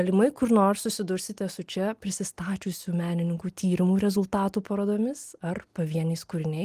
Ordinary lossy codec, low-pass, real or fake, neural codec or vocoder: Opus, 24 kbps; 14.4 kHz; real; none